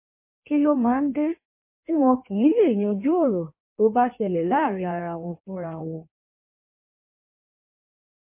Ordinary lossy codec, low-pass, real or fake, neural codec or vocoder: MP3, 24 kbps; 3.6 kHz; fake; codec, 16 kHz in and 24 kHz out, 1.1 kbps, FireRedTTS-2 codec